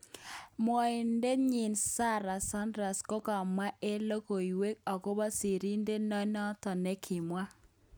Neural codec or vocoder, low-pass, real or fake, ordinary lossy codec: none; none; real; none